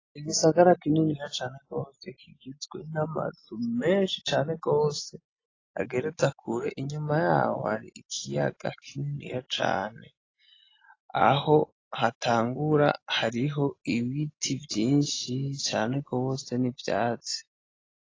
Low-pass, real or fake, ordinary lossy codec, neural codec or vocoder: 7.2 kHz; real; AAC, 32 kbps; none